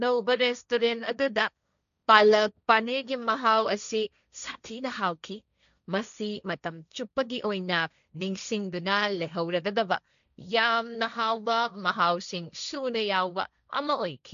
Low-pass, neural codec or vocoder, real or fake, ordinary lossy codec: 7.2 kHz; codec, 16 kHz, 1.1 kbps, Voila-Tokenizer; fake; none